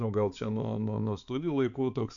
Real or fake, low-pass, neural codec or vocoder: fake; 7.2 kHz; codec, 16 kHz, 4 kbps, X-Codec, HuBERT features, trained on balanced general audio